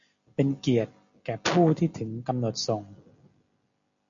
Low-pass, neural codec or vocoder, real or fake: 7.2 kHz; none; real